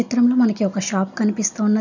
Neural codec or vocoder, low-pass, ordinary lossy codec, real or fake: none; 7.2 kHz; none; real